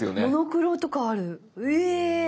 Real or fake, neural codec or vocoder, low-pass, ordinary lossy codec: real; none; none; none